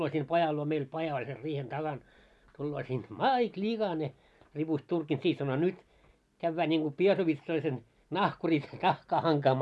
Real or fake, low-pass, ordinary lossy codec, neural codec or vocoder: real; none; none; none